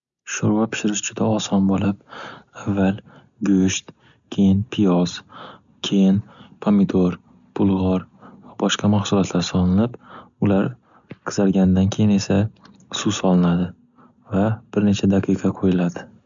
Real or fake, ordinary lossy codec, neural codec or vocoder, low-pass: real; none; none; 7.2 kHz